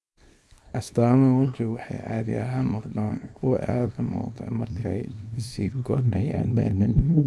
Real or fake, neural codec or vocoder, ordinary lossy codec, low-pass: fake; codec, 24 kHz, 0.9 kbps, WavTokenizer, small release; none; none